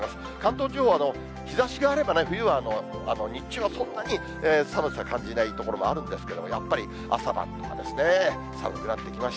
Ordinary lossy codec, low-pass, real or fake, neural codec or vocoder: none; none; real; none